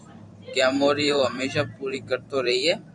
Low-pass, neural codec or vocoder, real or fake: 10.8 kHz; vocoder, 44.1 kHz, 128 mel bands every 512 samples, BigVGAN v2; fake